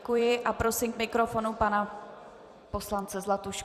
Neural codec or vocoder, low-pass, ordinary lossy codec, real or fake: vocoder, 44.1 kHz, 128 mel bands every 256 samples, BigVGAN v2; 14.4 kHz; Opus, 64 kbps; fake